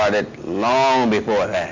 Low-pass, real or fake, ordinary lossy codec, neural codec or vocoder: 7.2 kHz; real; MP3, 48 kbps; none